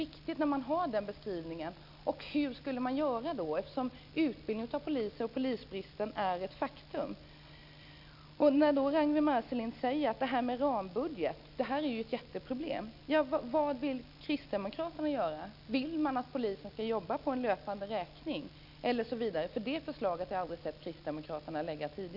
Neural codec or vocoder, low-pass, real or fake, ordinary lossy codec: none; 5.4 kHz; real; none